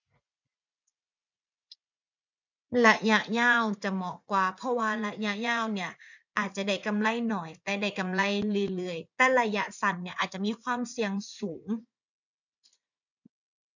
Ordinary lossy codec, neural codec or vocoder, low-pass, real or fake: none; vocoder, 44.1 kHz, 80 mel bands, Vocos; 7.2 kHz; fake